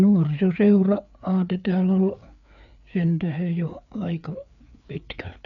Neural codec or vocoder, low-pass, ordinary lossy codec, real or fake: codec, 16 kHz, 4 kbps, FreqCodec, larger model; 7.2 kHz; none; fake